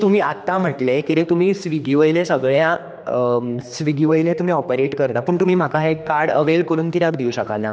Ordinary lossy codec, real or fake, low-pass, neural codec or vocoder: none; fake; none; codec, 16 kHz, 2 kbps, X-Codec, HuBERT features, trained on general audio